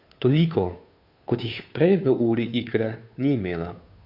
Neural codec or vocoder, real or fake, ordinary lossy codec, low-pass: codec, 16 kHz, 2 kbps, FunCodec, trained on Chinese and English, 25 frames a second; fake; none; 5.4 kHz